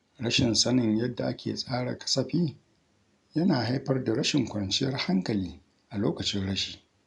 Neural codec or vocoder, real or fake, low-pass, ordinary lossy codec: none; real; 10.8 kHz; none